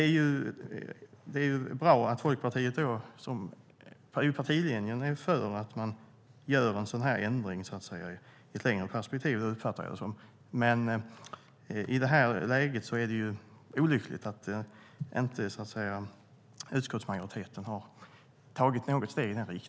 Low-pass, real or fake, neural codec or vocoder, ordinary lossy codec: none; real; none; none